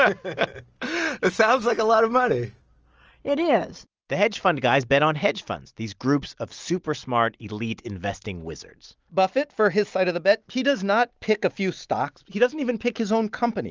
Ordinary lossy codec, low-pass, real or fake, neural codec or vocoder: Opus, 24 kbps; 7.2 kHz; real; none